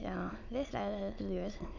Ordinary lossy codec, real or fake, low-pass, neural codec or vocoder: none; fake; 7.2 kHz; autoencoder, 22.05 kHz, a latent of 192 numbers a frame, VITS, trained on many speakers